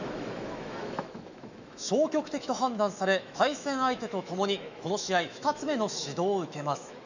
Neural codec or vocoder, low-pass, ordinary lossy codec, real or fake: autoencoder, 48 kHz, 128 numbers a frame, DAC-VAE, trained on Japanese speech; 7.2 kHz; none; fake